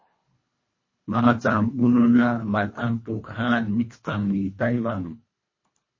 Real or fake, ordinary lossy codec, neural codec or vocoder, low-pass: fake; MP3, 32 kbps; codec, 24 kHz, 1.5 kbps, HILCodec; 7.2 kHz